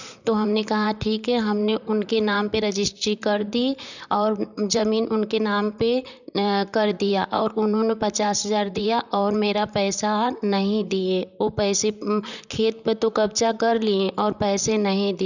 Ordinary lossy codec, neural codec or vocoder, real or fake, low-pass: none; vocoder, 44.1 kHz, 128 mel bands, Pupu-Vocoder; fake; 7.2 kHz